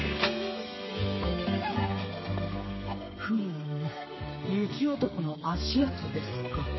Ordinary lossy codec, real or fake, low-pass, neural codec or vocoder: MP3, 24 kbps; fake; 7.2 kHz; codec, 32 kHz, 1.9 kbps, SNAC